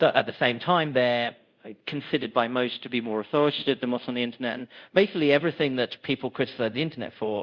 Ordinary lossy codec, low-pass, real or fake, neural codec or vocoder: Opus, 64 kbps; 7.2 kHz; fake; codec, 24 kHz, 0.5 kbps, DualCodec